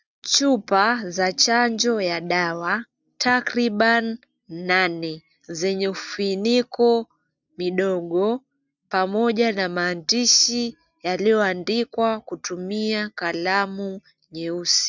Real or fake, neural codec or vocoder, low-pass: real; none; 7.2 kHz